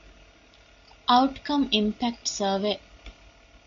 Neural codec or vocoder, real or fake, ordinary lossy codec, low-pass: none; real; MP3, 64 kbps; 7.2 kHz